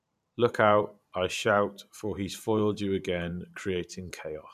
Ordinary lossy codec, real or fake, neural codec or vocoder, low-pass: none; fake; vocoder, 44.1 kHz, 128 mel bands every 512 samples, BigVGAN v2; 14.4 kHz